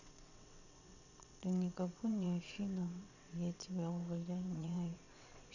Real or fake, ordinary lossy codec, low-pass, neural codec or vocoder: fake; none; 7.2 kHz; vocoder, 22.05 kHz, 80 mel bands, WaveNeXt